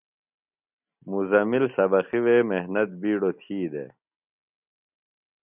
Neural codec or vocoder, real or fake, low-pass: none; real; 3.6 kHz